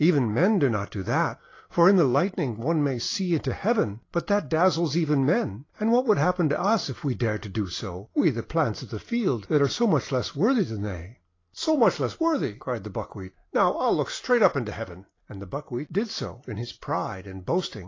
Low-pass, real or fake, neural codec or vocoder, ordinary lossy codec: 7.2 kHz; real; none; AAC, 32 kbps